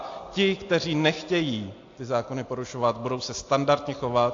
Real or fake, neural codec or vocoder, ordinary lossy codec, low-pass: real; none; AAC, 48 kbps; 7.2 kHz